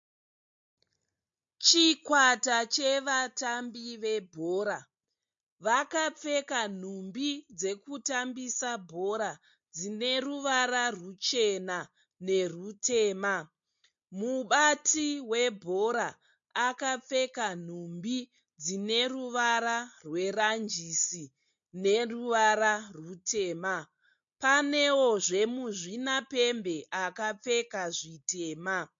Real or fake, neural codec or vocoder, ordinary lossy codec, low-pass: real; none; MP3, 48 kbps; 7.2 kHz